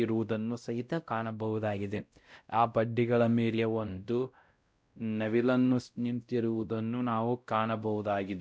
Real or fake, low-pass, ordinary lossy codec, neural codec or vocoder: fake; none; none; codec, 16 kHz, 0.5 kbps, X-Codec, WavLM features, trained on Multilingual LibriSpeech